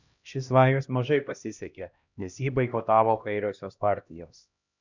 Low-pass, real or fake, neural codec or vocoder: 7.2 kHz; fake; codec, 16 kHz, 1 kbps, X-Codec, HuBERT features, trained on LibriSpeech